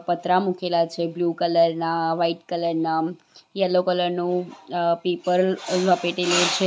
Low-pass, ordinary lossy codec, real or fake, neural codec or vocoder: none; none; real; none